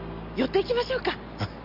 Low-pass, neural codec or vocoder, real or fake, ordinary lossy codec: 5.4 kHz; none; real; Opus, 64 kbps